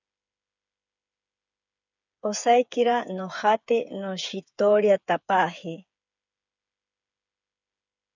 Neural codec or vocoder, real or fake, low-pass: codec, 16 kHz, 8 kbps, FreqCodec, smaller model; fake; 7.2 kHz